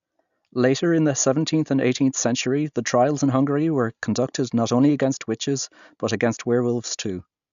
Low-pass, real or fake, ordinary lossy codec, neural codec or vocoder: 7.2 kHz; real; none; none